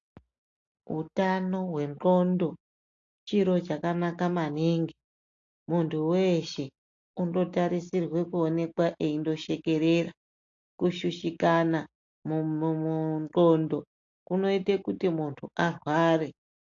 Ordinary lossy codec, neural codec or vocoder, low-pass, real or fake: AAC, 48 kbps; none; 7.2 kHz; real